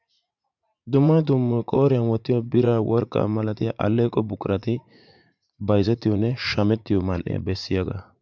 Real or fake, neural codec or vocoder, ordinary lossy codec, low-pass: fake; vocoder, 44.1 kHz, 80 mel bands, Vocos; MP3, 64 kbps; 7.2 kHz